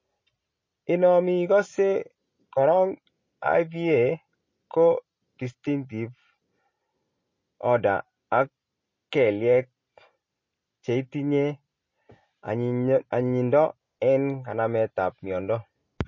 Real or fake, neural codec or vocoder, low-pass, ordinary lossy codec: real; none; 7.2 kHz; MP3, 32 kbps